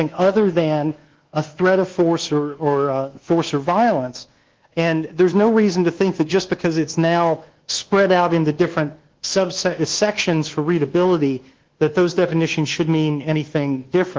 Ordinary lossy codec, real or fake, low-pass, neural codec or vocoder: Opus, 16 kbps; fake; 7.2 kHz; codec, 24 kHz, 1.2 kbps, DualCodec